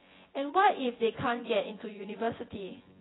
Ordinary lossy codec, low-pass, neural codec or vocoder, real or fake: AAC, 16 kbps; 7.2 kHz; vocoder, 24 kHz, 100 mel bands, Vocos; fake